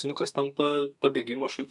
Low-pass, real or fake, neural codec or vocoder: 10.8 kHz; fake; codec, 32 kHz, 1.9 kbps, SNAC